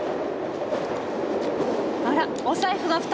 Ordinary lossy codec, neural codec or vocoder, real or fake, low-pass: none; none; real; none